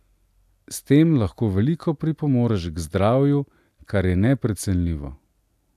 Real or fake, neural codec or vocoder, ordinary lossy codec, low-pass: real; none; none; 14.4 kHz